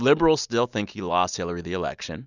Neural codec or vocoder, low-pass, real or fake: none; 7.2 kHz; real